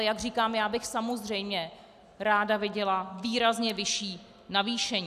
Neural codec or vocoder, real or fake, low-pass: none; real; 14.4 kHz